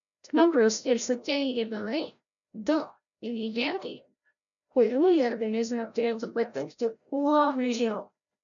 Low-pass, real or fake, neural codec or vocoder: 7.2 kHz; fake; codec, 16 kHz, 0.5 kbps, FreqCodec, larger model